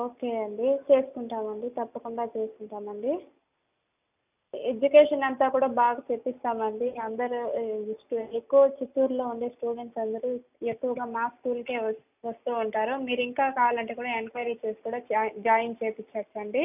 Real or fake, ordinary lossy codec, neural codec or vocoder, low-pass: real; none; none; 3.6 kHz